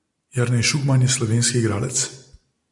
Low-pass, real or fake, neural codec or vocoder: 10.8 kHz; real; none